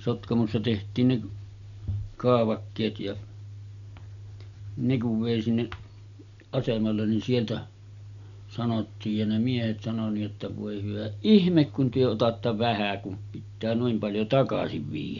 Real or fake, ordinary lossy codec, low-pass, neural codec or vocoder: real; none; 7.2 kHz; none